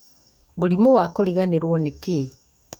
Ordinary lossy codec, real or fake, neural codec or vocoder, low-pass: none; fake; codec, 44.1 kHz, 2.6 kbps, DAC; none